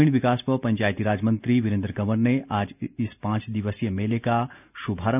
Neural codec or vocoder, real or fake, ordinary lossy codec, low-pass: none; real; none; 3.6 kHz